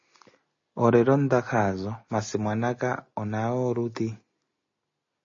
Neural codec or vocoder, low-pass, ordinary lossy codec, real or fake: none; 7.2 kHz; MP3, 32 kbps; real